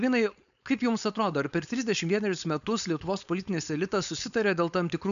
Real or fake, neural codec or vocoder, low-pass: fake; codec, 16 kHz, 4.8 kbps, FACodec; 7.2 kHz